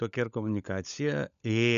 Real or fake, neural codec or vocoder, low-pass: fake; codec, 16 kHz, 16 kbps, FunCodec, trained on LibriTTS, 50 frames a second; 7.2 kHz